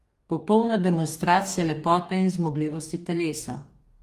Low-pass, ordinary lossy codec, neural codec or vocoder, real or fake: 14.4 kHz; Opus, 32 kbps; codec, 44.1 kHz, 2.6 kbps, DAC; fake